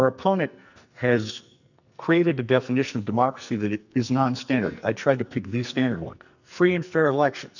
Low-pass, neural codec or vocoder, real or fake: 7.2 kHz; codec, 44.1 kHz, 2.6 kbps, SNAC; fake